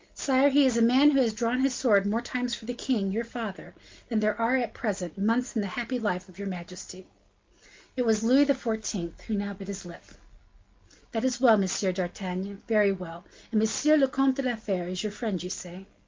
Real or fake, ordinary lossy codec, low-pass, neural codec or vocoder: real; Opus, 24 kbps; 7.2 kHz; none